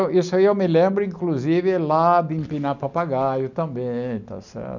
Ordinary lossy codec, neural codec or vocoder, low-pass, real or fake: none; none; 7.2 kHz; real